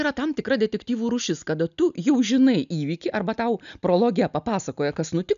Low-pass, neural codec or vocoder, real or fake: 7.2 kHz; none; real